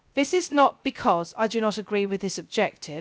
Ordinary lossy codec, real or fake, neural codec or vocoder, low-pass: none; fake; codec, 16 kHz, 0.3 kbps, FocalCodec; none